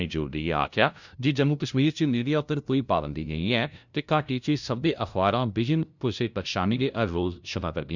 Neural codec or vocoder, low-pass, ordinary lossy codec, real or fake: codec, 16 kHz, 0.5 kbps, FunCodec, trained on LibriTTS, 25 frames a second; 7.2 kHz; none; fake